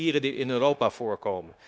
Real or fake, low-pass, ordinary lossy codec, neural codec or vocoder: fake; none; none; codec, 16 kHz, 0.9 kbps, LongCat-Audio-Codec